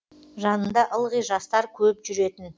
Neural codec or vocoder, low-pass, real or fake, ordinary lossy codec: none; none; real; none